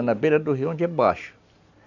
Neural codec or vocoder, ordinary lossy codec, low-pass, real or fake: none; none; 7.2 kHz; real